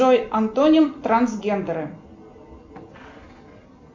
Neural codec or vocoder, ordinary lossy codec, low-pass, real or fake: none; MP3, 48 kbps; 7.2 kHz; real